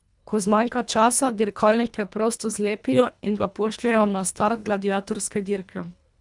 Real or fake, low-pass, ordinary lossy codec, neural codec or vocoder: fake; 10.8 kHz; none; codec, 24 kHz, 1.5 kbps, HILCodec